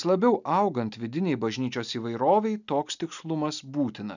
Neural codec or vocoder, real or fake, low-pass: none; real; 7.2 kHz